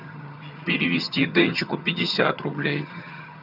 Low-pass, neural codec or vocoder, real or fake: 5.4 kHz; vocoder, 22.05 kHz, 80 mel bands, HiFi-GAN; fake